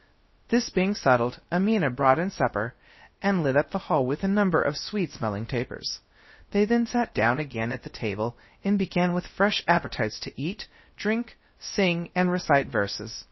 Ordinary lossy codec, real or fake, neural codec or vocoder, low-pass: MP3, 24 kbps; fake; codec, 16 kHz, 0.3 kbps, FocalCodec; 7.2 kHz